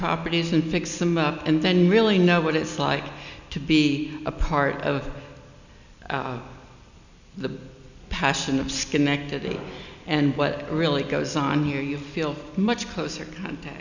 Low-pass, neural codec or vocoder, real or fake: 7.2 kHz; none; real